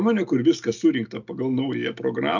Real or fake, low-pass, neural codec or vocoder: real; 7.2 kHz; none